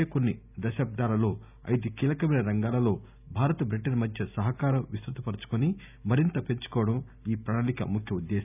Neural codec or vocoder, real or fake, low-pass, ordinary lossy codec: none; real; 3.6 kHz; none